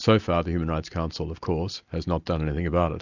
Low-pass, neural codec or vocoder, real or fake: 7.2 kHz; none; real